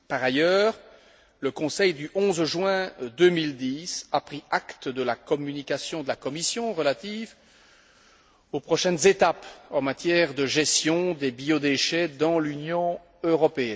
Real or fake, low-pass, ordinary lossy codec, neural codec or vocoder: real; none; none; none